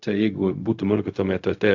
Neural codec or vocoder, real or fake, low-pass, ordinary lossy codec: codec, 16 kHz, 0.4 kbps, LongCat-Audio-Codec; fake; 7.2 kHz; AAC, 48 kbps